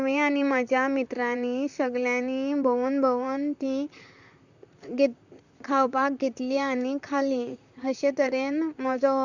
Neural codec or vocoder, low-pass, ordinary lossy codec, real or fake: vocoder, 44.1 kHz, 128 mel bands, Pupu-Vocoder; 7.2 kHz; none; fake